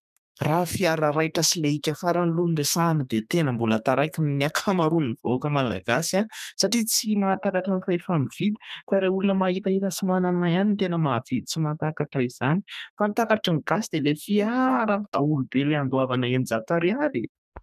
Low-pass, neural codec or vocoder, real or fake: 14.4 kHz; codec, 32 kHz, 1.9 kbps, SNAC; fake